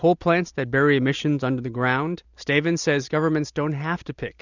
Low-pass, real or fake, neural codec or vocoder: 7.2 kHz; real; none